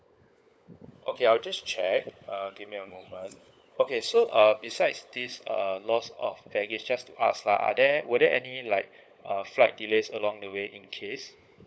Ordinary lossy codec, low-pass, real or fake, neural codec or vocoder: none; none; fake; codec, 16 kHz, 16 kbps, FunCodec, trained on LibriTTS, 50 frames a second